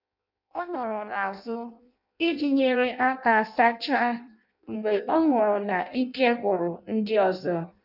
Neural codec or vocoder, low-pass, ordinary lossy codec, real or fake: codec, 16 kHz in and 24 kHz out, 0.6 kbps, FireRedTTS-2 codec; 5.4 kHz; none; fake